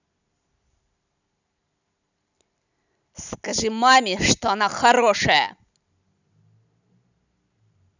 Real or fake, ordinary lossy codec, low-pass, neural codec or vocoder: real; none; 7.2 kHz; none